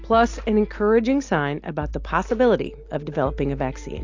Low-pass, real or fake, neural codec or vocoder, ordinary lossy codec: 7.2 kHz; real; none; AAC, 48 kbps